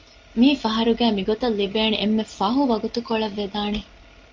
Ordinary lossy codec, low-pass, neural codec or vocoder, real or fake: Opus, 32 kbps; 7.2 kHz; none; real